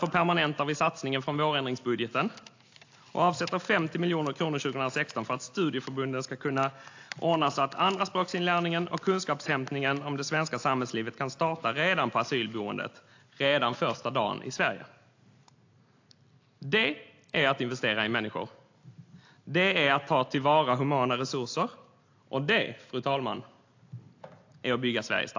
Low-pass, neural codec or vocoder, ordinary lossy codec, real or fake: 7.2 kHz; none; AAC, 48 kbps; real